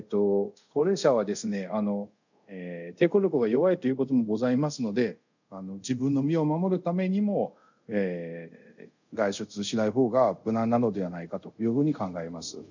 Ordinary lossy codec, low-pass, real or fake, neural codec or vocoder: none; 7.2 kHz; fake; codec, 24 kHz, 0.5 kbps, DualCodec